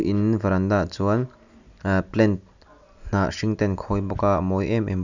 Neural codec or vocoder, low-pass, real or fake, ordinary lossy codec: none; 7.2 kHz; real; none